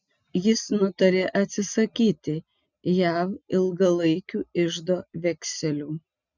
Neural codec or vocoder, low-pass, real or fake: none; 7.2 kHz; real